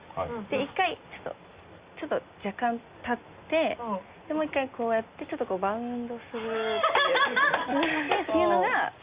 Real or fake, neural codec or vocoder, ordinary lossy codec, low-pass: real; none; Opus, 64 kbps; 3.6 kHz